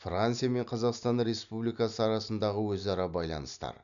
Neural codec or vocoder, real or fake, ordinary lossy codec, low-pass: none; real; none; 7.2 kHz